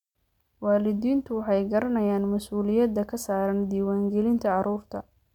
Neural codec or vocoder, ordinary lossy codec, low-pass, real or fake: none; none; 19.8 kHz; real